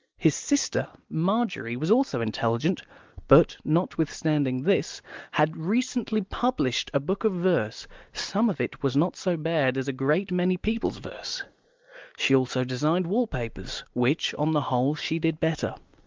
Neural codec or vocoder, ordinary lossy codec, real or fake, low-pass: none; Opus, 24 kbps; real; 7.2 kHz